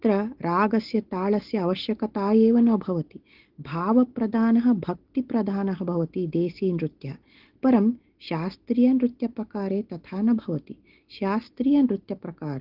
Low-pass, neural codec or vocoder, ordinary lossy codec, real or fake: 5.4 kHz; none; Opus, 16 kbps; real